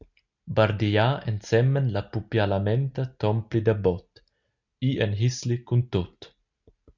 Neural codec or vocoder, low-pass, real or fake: none; 7.2 kHz; real